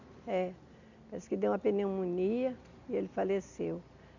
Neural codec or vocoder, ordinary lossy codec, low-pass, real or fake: none; none; 7.2 kHz; real